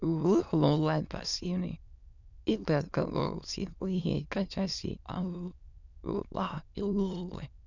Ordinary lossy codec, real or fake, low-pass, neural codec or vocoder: none; fake; 7.2 kHz; autoencoder, 22.05 kHz, a latent of 192 numbers a frame, VITS, trained on many speakers